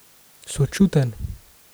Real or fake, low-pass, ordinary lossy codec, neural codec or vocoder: real; none; none; none